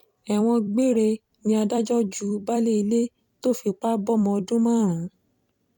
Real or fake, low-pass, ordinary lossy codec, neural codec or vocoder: fake; 19.8 kHz; none; vocoder, 44.1 kHz, 128 mel bands every 256 samples, BigVGAN v2